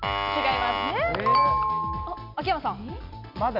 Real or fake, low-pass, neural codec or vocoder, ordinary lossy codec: real; 5.4 kHz; none; none